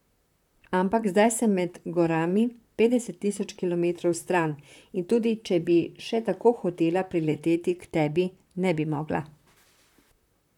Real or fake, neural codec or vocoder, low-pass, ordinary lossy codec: fake; vocoder, 44.1 kHz, 128 mel bands, Pupu-Vocoder; 19.8 kHz; none